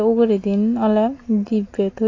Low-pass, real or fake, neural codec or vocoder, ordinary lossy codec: 7.2 kHz; real; none; AAC, 32 kbps